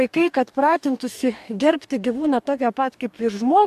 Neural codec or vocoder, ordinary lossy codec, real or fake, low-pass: codec, 44.1 kHz, 2.6 kbps, DAC; MP3, 96 kbps; fake; 14.4 kHz